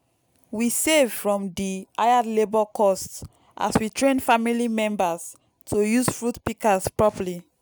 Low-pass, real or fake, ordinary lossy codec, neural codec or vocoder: none; real; none; none